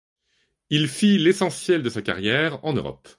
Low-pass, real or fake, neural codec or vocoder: 10.8 kHz; real; none